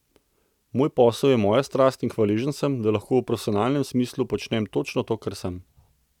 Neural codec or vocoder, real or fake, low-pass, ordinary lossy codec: none; real; 19.8 kHz; none